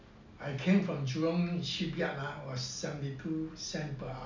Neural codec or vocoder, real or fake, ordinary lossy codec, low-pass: none; real; MP3, 64 kbps; 7.2 kHz